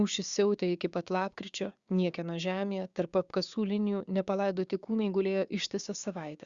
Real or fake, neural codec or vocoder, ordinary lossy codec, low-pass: fake; codec, 16 kHz, 6 kbps, DAC; Opus, 64 kbps; 7.2 kHz